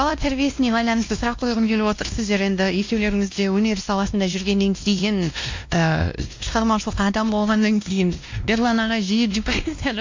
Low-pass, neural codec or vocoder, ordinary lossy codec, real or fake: 7.2 kHz; codec, 16 kHz, 1 kbps, X-Codec, WavLM features, trained on Multilingual LibriSpeech; MP3, 64 kbps; fake